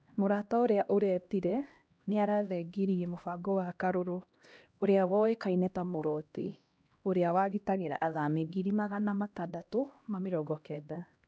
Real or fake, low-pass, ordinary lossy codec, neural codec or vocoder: fake; none; none; codec, 16 kHz, 1 kbps, X-Codec, HuBERT features, trained on LibriSpeech